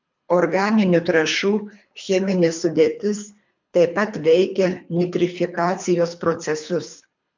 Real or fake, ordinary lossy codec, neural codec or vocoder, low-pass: fake; MP3, 64 kbps; codec, 24 kHz, 3 kbps, HILCodec; 7.2 kHz